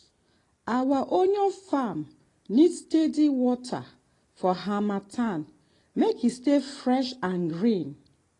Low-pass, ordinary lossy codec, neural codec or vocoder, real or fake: 10.8 kHz; AAC, 32 kbps; none; real